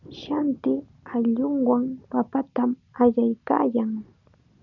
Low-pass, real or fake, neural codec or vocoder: 7.2 kHz; real; none